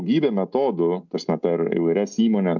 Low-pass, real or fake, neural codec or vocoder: 7.2 kHz; real; none